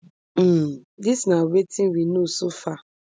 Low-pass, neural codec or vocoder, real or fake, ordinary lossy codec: none; none; real; none